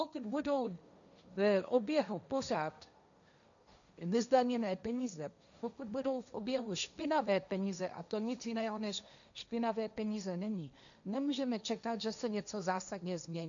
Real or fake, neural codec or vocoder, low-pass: fake; codec, 16 kHz, 1.1 kbps, Voila-Tokenizer; 7.2 kHz